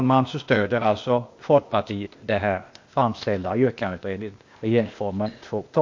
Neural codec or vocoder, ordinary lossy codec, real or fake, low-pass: codec, 16 kHz, 0.8 kbps, ZipCodec; MP3, 48 kbps; fake; 7.2 kHz